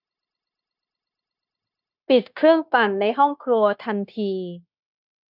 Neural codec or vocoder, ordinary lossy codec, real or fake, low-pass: codec, 16 kHz, 0.9 kbps, LongCat-Audio-Codec; none; fake; 5.4 kHz